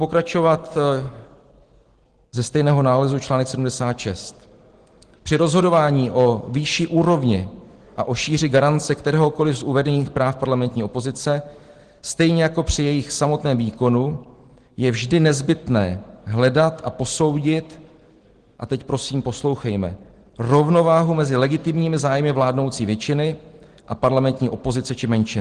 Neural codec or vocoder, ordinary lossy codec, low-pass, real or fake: none; Opus, 16 kbps; 9.9 kHz; real